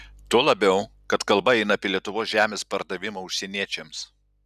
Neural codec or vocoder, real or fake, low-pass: none; real; 14.4 kHz